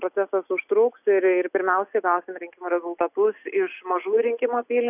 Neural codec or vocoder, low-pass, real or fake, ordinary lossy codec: none; 3.6 kHz; real; AAC, 32 kbps